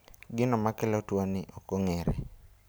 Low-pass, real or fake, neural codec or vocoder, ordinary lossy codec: none; real; none; none